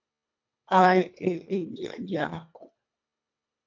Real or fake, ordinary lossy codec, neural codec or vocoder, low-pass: fake; MP3, 64 kbps; codec, 24 kHz, 1.5 kbps, HILCodec; 7.2 kHz